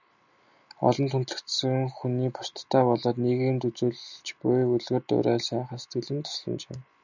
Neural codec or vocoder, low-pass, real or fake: none; 7.2 kHz; real